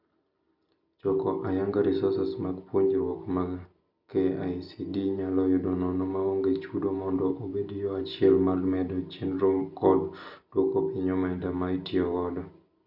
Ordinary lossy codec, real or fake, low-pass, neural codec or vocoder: none; real; 5.4 kHz; none